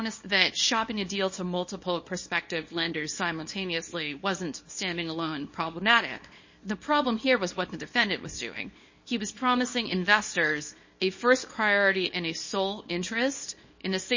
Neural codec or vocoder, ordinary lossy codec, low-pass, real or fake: codec, 24 kHz, 0.9 kbps, WavTokenizer, small release; MP3, 32 kbps; 7.2 kHz; fake